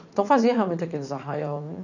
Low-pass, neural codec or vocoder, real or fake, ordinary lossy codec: 7.2 kHz; vocoder, 44.1 kHz, 80 mel bands, Vocos; fake; none